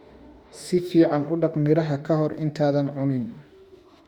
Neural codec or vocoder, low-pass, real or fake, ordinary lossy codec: autoencoder, 48 kHz, 32 numbers a frame, DAC-VAE, trained on Japanese speech; 19.8 kHz; fake; Opus, 64 kbps